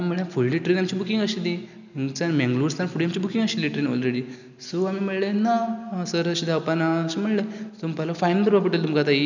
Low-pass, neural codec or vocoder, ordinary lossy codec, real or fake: 7.2 kHz; none; none; real